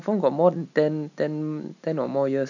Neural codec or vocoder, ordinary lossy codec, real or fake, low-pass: none; none; real; 7.2 kHz